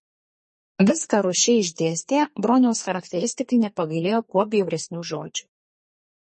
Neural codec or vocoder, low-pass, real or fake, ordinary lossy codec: codec, 32 kHz, 1.9 kbps, SNAC; 10.8 kHz; fake; MP3, 32 kbps